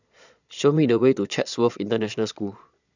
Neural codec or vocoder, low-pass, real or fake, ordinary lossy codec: vocoder, 22.05 kHz, 80 mel bands, Vocos; 7.2 kHz; fake; none